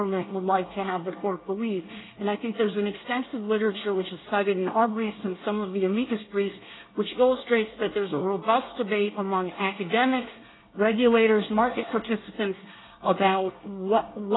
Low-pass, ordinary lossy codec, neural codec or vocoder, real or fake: 7.2 kHz; AAC, 16 kbps; codec, 24 kHz, 1 kbps, SNAC; fake